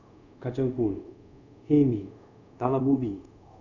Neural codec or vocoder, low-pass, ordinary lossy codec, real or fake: codec, 24 kHz, 0.5 kbps, DualCodec; 7.2 kHz; none; fake